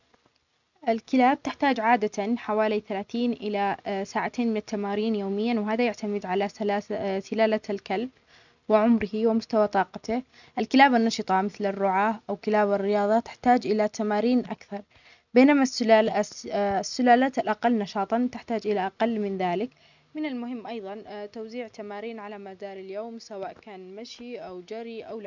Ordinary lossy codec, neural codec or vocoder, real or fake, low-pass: none; none; real; 7.2 kHz